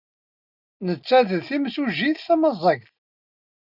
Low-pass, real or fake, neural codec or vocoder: 5.4 kHz; real; none